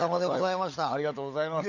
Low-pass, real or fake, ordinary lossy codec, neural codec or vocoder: 7.2 kHz; fake; none; codec, 16 kHz, 16 kbps, FunCodec, trained on LibriTTS, 50 frames a second